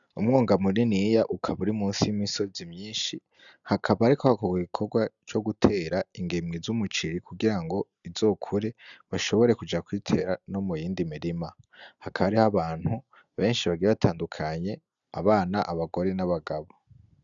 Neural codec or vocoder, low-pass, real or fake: none; 7.2 kHz; real